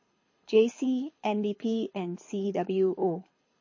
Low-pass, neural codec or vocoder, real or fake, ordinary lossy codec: 7.2 kHz; codec, 24 kHz, 6 kbps, HILCodec; fake; MP3, 32 kbps